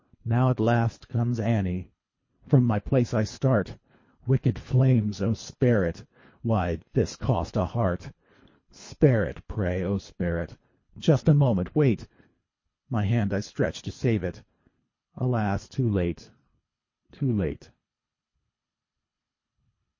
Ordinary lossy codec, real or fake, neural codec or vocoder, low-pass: MP3, 32 kbps; fake; codec, 24 kHz, 3 kbps, HILCodec; 7.2 kHz